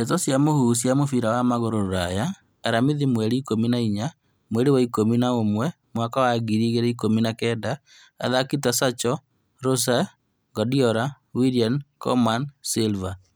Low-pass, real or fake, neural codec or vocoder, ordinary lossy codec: none; real; none; none